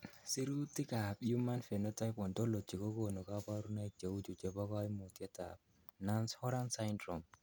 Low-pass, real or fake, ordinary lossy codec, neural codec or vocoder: none; real; none; none